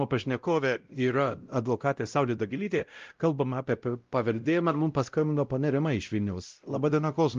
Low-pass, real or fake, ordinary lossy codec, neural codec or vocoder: 7.2 kHz; fake; Opus, 32 kbps; codec, 16 kHz, 0.5 kbps, X-Codec, WavLM features, trained on Multilingual LibriSpeech